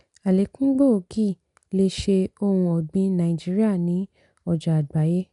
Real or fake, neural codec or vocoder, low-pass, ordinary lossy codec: real; none; 10.8 kHz; none